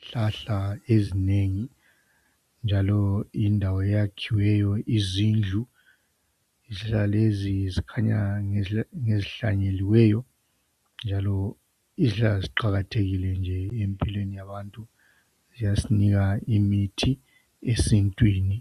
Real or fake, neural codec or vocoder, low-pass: real; none; 14.4 kHz